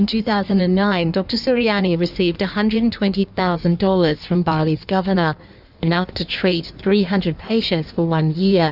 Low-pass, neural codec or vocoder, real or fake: 5.4 kHz; codec, 16 kHz in and 24 kHz out, 1.1 kbps, FireRedTTS-2 codec; fake